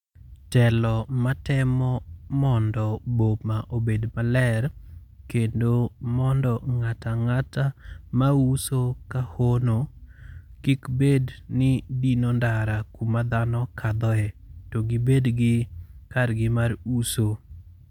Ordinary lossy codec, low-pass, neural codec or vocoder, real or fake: MP3, 96 kbps; 19.8 kHz; none; real